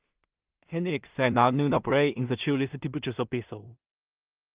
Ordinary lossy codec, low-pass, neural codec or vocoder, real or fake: Opus, 32 kbps; 3.6 kHz; codec, 16 kHz in and 24 kHz out, 0.4 kbps, LongCat-Audio-Codec, two codebook decoder; fake